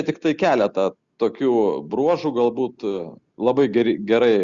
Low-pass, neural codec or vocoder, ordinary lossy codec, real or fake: 7.2 kHz; none; Opus, 64 kbps; real